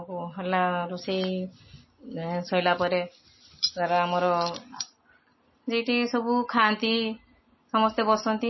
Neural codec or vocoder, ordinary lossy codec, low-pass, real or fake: none; MP3, 24 kbps; 7.2 kHz; real